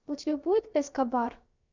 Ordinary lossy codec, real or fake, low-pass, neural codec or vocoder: Opus, 64 kbps; fake; 7.2 kHz; codec, 16 kHz, about 1 kbps, DyCAST, with the encoder's durations